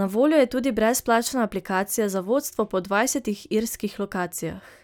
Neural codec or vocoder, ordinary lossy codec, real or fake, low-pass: none; none; real; none